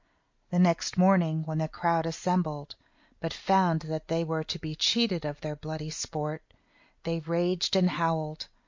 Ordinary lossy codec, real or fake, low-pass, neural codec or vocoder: MP3, 48 kbps; real; 7.2 kHz; none